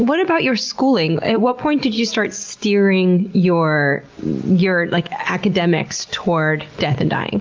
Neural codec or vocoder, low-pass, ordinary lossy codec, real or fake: none; 7.2 kHz; Opus, 24 kbps; real